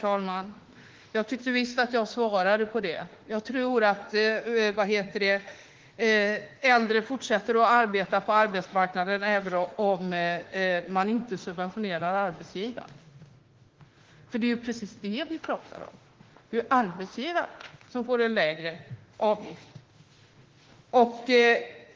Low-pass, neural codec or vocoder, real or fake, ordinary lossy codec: 7.2 kHz; autoencoder, 48 kHz, 32 numbers a frame, DAC-VAE, trained on Japanese speech; fake; Opus, 16 kbps